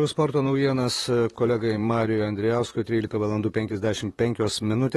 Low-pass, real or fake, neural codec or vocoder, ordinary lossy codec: 19.8 kHz; fake; vocoder, 44.1 kHz, 128 mel bands, Pupu-Vocoder; AAC, 32 kbps